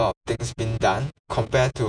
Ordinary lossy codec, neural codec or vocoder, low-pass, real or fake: none; vocoder, 48 kHz, 128 mel bands, Vocos; 9.9 kHz; fake